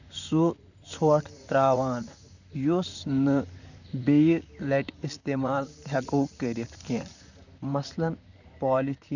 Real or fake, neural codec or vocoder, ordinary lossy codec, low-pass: fake; vocoder, 44.1 kHz, 128 mel bands, Pupu-Vocoder; none; 7.2 kHz